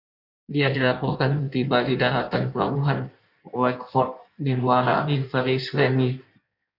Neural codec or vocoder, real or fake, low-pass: codec, 16 kHz in and 24 kHz out, 1.1 kbps, FireRedTTS-2 codec; fake; 5.4 kHz